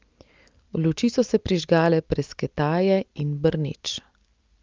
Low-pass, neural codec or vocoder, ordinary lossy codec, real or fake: 7.2 kHz; none; Opus, 24 kbps; real